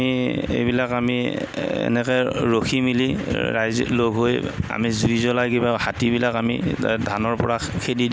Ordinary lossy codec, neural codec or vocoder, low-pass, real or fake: none; none; none; real